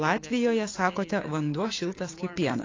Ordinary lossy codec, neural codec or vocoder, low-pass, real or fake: AAC, 32 kbps; codec, 16 kHz, 6 kbps, DAC; 7.2 kHz; fake